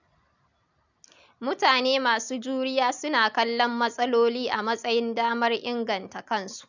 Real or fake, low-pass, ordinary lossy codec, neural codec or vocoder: real; 7.2 kHz; none; none